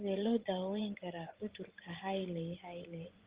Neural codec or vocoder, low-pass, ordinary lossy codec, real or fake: vocoder, 24 kHz, 100 mel bands, Vocos; 3.6 kHz; Opus, 16 kbps; fake